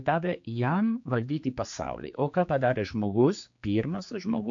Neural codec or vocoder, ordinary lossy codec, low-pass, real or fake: codec, 16 kHz, 4 kbps, X-Codec, HuBERT features, trained on general audio; AAC, 64 kbps; 7.2 kHz; fake